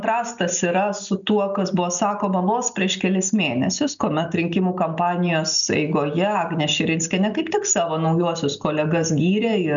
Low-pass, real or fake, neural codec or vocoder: 7.2 kHz; real; none